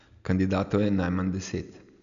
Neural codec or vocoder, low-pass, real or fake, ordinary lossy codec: none; 7.2 kHz; real; MP3, 96 kbps